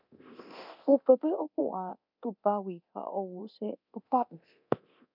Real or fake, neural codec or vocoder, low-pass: fake; codec, 24 kHz, 0.9 kbps, DualCodec; 5.4 kHz